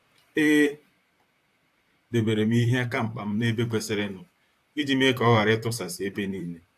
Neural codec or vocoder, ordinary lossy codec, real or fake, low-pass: vocoder, 44.1 kHz, 128 mel bands, Pupu-Vocoder; AAC, 64 kbps; fake; 14.4 kHz